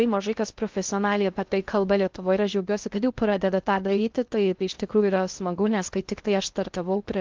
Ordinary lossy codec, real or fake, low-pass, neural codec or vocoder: Opus, 32 kbps; fake; 7.2 kHz; codec, 16 kHz in and 24 kHz out, 0.6 kbps, FocalCodec, streaming, 2048 codes